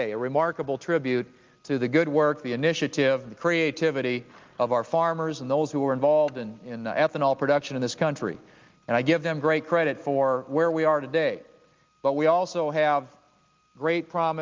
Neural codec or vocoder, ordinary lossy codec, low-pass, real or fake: none; Opus, 32 kbps; 7.2 kHz; real